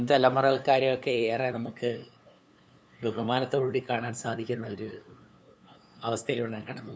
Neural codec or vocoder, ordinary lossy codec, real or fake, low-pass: codec, 16 kHz, 2 kbps, FunCodec, trained on LibriTTS, 25 frames a second; none; fake; none